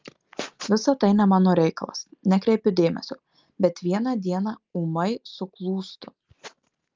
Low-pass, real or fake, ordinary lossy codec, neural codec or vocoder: 7.2 kHz; real; Opus, 32 kbps; none